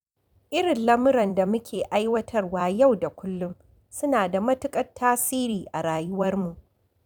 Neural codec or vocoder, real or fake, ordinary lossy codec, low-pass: vocoder, 44.1 kHz, 128 mel bands every 256 samples, BigVGAN v2; fake; none; 19.8 kHz